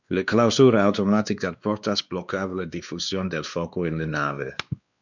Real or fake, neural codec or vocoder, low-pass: fake; codec, 16 kHz, 2 kbps, X-Codec, WavLM features, trained on Multilingual LibriSpeech; 7.2 kHz